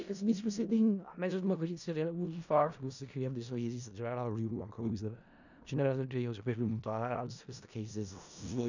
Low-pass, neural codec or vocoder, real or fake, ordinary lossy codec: 7.2 kHz; codec, 16 kHz in and 24 kHz out, 0.4 kbps, LongCat-Audio-Codec, four codebook decoder; fake; none